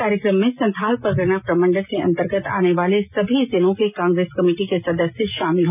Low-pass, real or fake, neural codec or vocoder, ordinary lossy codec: 3.6 kHz; real; none; none